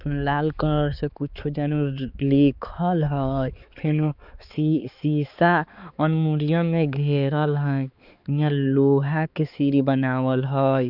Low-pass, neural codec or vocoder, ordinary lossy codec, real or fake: 5.4 kHz; codec, 16 kHz, 4 kbps, X-Codec, HuBERT features, trained on balanced general audio; Opus, 64 kbps; fake